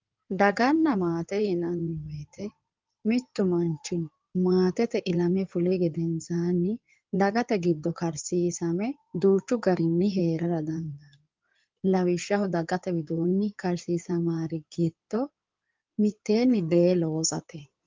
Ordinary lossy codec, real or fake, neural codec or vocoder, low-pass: Opus, 32 kbps; fake; codec, 16 kHz in and 24 kHz out, 2.2 kbps, FireRedTTS-2 codec; 7.2 kHz